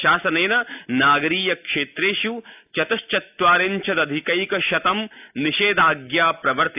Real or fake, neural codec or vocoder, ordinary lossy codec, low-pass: real; none; AAC, 32 kbps; 3.6 kHz